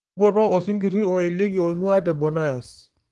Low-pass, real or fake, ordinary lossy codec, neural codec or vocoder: 10.8 kHz; fake; Opus, 24 kbps; codec, 24 kHz, 1 kbps, SNAC